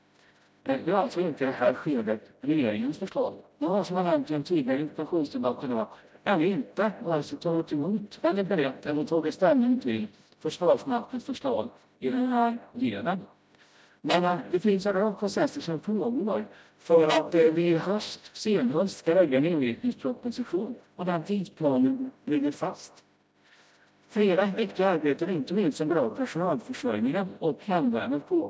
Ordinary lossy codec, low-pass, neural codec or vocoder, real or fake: none; none; codec, 16 kHz, 0.5 kbps, FreqCodec, smaller model; fake